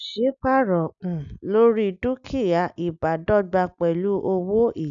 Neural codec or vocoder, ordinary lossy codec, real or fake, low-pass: none; none; real; 7.2 kHz